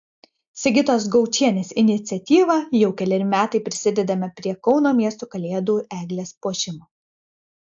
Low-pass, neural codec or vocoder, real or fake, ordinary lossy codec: 7.2 kHz; none; real; AAC, 64 kbps